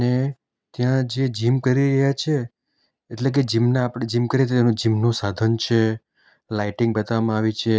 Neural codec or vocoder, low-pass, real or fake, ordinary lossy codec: none; none; real; none